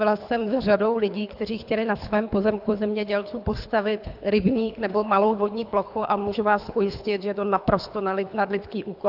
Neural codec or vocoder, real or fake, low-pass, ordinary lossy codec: codec, 24 kHz, 3 kbps, HILCodec; fake; 5.4 kHz; AAC, 48 kbps